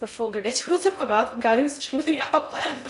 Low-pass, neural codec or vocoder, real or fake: 10.8 kHz; codec, 16 kHz in and 24 kHz out, 0.6 kbps, FocalCodec, streaming, 4096 codes; fake